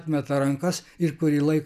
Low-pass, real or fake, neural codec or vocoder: 14.4 kHz; real; none